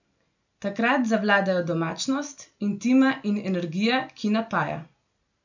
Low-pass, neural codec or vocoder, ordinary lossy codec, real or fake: 7.2 kHz; none; none; real